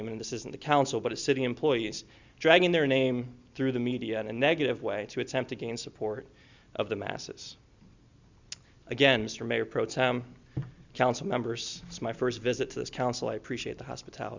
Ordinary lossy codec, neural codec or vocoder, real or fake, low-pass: Opus, 64 kbps; none; real; 7.2 kHz